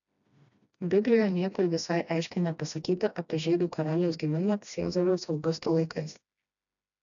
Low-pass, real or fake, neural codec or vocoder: 7.2 kHz; fake; codec, 16 kHz, 1 kbps, FreqCodec, smaller model